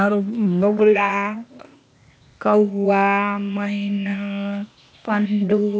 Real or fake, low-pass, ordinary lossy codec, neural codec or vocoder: fake; none; none; codec, 16 kHz, 0.8 kbps, ZipCodec